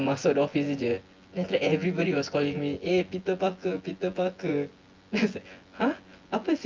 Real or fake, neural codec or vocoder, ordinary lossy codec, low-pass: fake; vocoder, 24 kHz, 100 mel bands, Vocos; Opus, 32 kbps; 7.2 kHz